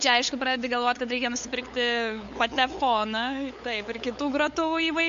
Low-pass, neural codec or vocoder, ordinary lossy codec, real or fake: 7.2 kHz; codec, 16 kHz, 8 kbps, FunCodec, trained on LibriTTS, 25 frames a second; MP3, 48 kbps; fake